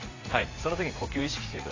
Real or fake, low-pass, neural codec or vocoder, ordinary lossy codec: fake; 7.2 kHz; vocoder, 44.1 kHz, 80 mel bands, Vocos; AAC, 32 kbps